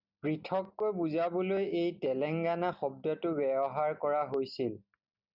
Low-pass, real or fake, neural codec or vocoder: 5.4 kHz; real; none